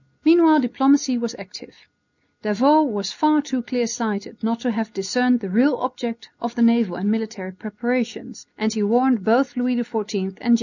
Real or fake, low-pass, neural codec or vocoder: real; 7.2 kHz; none